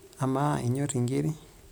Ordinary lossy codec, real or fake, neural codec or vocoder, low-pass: none; real; none; none